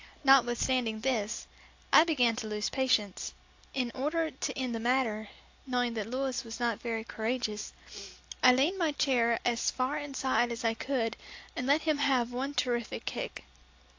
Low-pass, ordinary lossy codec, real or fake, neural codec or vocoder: 7.2 kHz; AAC, 48 kbps; real; none